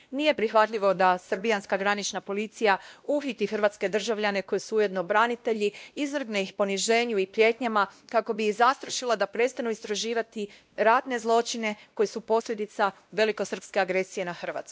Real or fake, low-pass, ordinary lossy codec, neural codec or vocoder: fake; none; none; codec, 16 kHz, 1 kbps, X-Codec, WavLM features, trained on Multilingual LibriSpeech